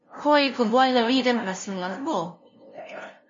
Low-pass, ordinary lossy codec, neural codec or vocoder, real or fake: 7.2 kHz; MP3, 32 kbps; codec, 16 kHz, 0.5 kbps, FunCodec, trained on LibriTTS, 25 frames a second; fake